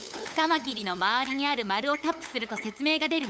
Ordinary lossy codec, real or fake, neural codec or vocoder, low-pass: none; fake; codec, 16 kHz, 8 kbps, FunCodec, trained on LibriTTS, 25 frames a second; none